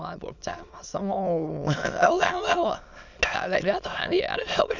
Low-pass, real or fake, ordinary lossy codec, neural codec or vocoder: 7.2 kHz; fake; none; autoencoder, 22.05 kHz, a latent of 192 numbers a frame, VITS, trained on many speakers